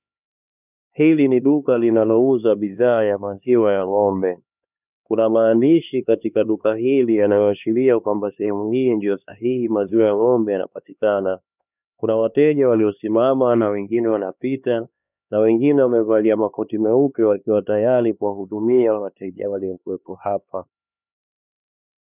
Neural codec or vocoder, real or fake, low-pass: codec, 16 kHz, 2 kbps, X-Codec, HuBERT features, trained on LibriSpeech; fake; 3.6 kHz